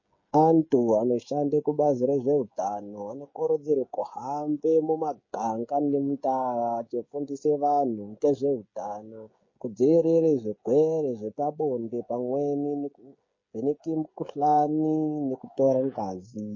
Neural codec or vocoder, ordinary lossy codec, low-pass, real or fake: codec, 16 kHz, 16 kbps, FreqCodec, smaller model; MP3, 32 kbps; 7.2 kHz; fake